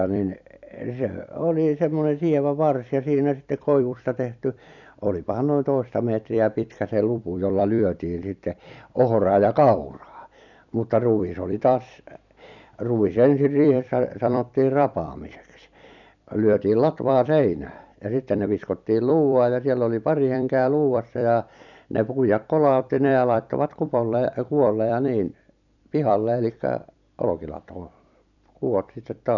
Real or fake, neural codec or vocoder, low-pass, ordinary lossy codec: fake; vocoder, 44.1 kHz, 128 mel bands every 256 samples, BigVGAN v2; 7.2 kHz; none